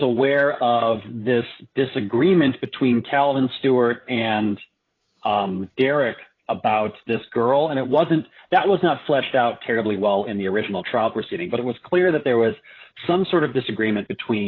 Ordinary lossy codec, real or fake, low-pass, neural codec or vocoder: AAC, 32 kbps; fake; 7.2 kHz; codec, 16 kHz, 8 kbps, FreqCodec, larger model